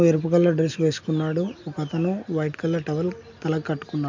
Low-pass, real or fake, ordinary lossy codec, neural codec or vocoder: 7.2 kHz; real; MP3, 64 kbps; none